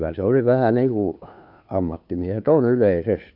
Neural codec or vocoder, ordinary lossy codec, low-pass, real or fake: codec, 16 kHz, 2 kbps, FunCodec, trained on Chinese and English, 25 frames a second; AAC, 48 kbps; 5.4 kHz; fake